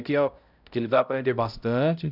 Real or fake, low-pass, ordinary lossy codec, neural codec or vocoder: fake; 5.4 kHz; none; codec, 16 kHz, 0.5 kbps, X-Codec, HuBERT features, trained on balanced general audio